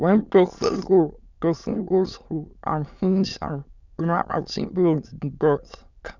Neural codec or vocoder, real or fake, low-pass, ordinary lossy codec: autoencoder, 22.05 kHz, a latent of 192 numbers a frame, VITS, trained on many speakers; fake; 7.2 kHz; none